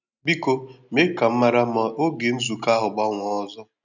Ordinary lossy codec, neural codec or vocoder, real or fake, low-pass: none; none; real; 7.2 kHz